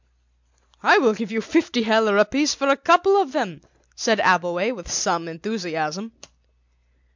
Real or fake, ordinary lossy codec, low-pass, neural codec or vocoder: real; MP3, 64 kbps; 7.2 kHz; none